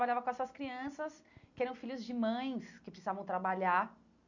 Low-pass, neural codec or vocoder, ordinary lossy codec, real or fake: 7.2 kHz; none; none; real